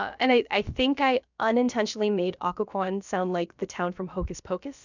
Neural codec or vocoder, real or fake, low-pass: codec, 16 kHz, about 1 kbps, DyCAST, with the encoder's durations; fake; 7.2 kHz